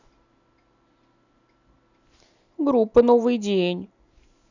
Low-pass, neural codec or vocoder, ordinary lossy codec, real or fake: 7.2 kHz; none; none; real